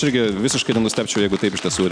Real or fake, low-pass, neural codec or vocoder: real; 9.9 kHz; none